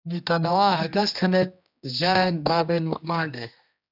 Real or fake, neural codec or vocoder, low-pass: fake; codec, 16 kHz, 1 kbps, X-Codec, HuBERT features, trained on general audio; 5.4 kHz